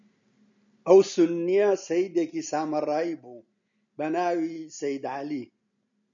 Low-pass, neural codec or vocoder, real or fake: 7.2 kHz; none; real